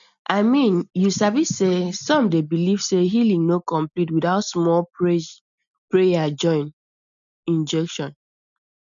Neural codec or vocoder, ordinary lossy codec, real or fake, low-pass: none; none; real; 7.2 kHz